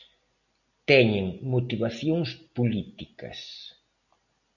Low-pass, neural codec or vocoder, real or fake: 7.2 kHz; none; real